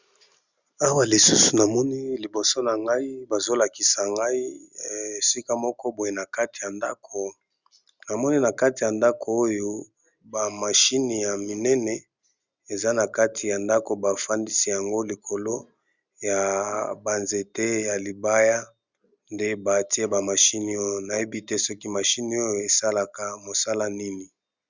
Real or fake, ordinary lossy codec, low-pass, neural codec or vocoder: real; Opus, 64 kbps; 7.2 kHz; none